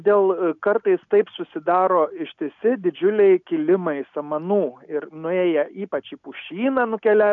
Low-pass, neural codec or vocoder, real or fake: 7.2 kHz; none; real